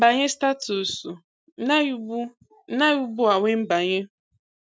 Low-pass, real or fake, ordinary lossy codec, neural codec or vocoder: none; real; none; none